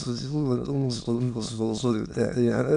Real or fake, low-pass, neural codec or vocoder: fake; 9.9 kHz; autoencoder, 22.05 kHz, a latent of 192 numbers a frame, VITS, trained on many speakers